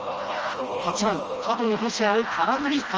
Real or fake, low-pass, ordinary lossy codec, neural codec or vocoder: fake; 7.2 kHz; Opus, 24 kbps; codec, 16 kHz, 1 kbps, FreqCodec, smaller model